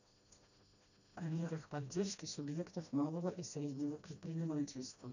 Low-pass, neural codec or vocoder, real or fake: 7.2 kHz; codec, 16 kHz, 1 kbps, FreqCodec, smaller model; fake